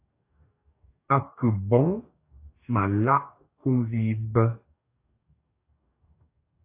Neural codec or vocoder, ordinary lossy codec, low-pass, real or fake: codec, 44.1 kHz, 2.6 kbps, DAC; AAC, 24 kbps; 3.6 kHz; fake